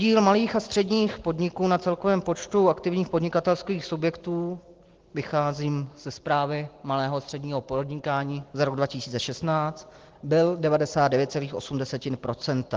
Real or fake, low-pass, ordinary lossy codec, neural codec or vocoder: real; 7.2 kHz; Opus, 16 kbps; none